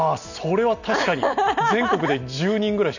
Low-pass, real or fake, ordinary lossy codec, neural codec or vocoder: 7.2 kHz; real; none; none